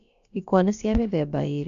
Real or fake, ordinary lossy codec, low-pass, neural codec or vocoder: fake; AAC, 96 kbps; 7.2 kHz; codec, 16 kHz, about 1 kbps, DyCAST, with the encoder's durations